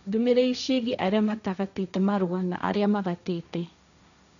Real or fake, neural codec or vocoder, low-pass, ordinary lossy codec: fake; codec, 16 kHz, 1.1 kbps, Voila-Tokenizer; 7.2 kHz; none